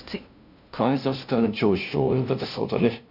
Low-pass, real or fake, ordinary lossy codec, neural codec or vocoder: 5.4 kHz; fake; none; codec, 16 kHz, 0.5 kbps, FunCodec, trained on LibriTTS, 25 frames a second